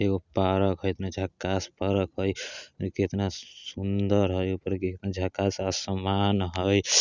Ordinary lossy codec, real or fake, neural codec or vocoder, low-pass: none; real; none; 7.2 kHz